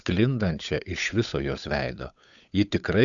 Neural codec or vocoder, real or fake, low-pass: codec, 16 kHz, 8 kbps, FunCodec, trained on Chinese and English, 25 frames a second; fake; 7.2 kHz